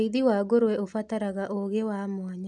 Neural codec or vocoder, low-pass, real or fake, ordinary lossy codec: none; 10.8 kHz; real; none